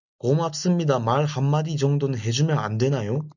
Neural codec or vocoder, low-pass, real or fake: none; 7.2 kHz; real